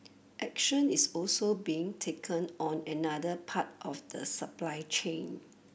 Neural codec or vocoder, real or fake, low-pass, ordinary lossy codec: none; real; none; none